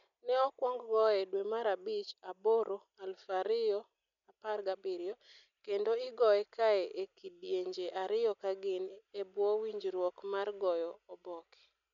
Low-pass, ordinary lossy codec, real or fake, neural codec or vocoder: 7.2 kHz; none; real; none